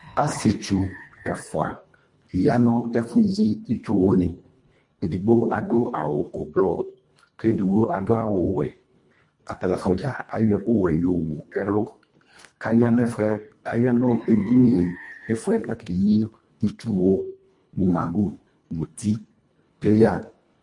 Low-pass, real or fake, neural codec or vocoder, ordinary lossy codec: 10.8 kHz; fake; codec, 24 kHz, 1.5 kbps, HILCodec; MP3, 48 kbps